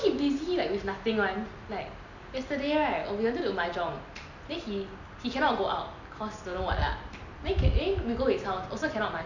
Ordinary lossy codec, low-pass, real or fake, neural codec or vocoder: none; 7.2 kHz; real; none